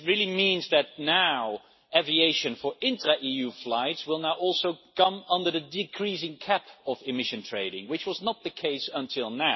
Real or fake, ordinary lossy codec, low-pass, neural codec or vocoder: real; MP3, 24 kbps; 7.2 kHz; none